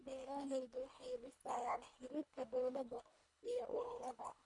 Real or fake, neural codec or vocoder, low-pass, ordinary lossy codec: fake; codec, 24 kHz, 1.5 kbps, HILCodec; 10.8 kHz; none